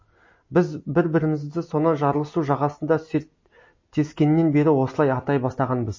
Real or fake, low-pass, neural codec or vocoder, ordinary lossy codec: real; 7.2 kHz; none; MP3, 32 kbps